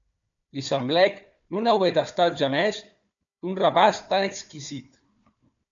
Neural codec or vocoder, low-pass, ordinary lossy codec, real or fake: codec, 16 kHz, 4 kbps, FunCodec, trained on Chinese and English, 50 frames a second; 7.2 kHz; MP3, 64 kbps; fake